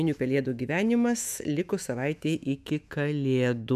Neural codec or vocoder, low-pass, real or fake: autoencoder, 48 kHz, 128 numbers a frame, DAC-VAE, trained on Japanese speech; 14.4 kHz; fake